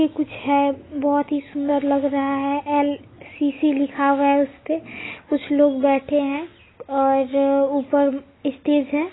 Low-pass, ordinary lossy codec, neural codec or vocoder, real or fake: 7.2 kHz; AAC, 16 kbps; none; real